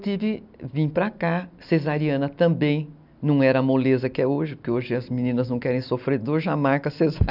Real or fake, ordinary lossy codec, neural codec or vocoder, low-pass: real; none; none; 5.4 kHz